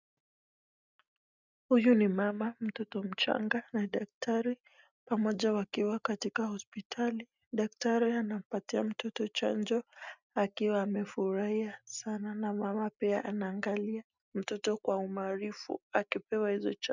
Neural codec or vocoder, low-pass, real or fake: none; 7.2 kHz; real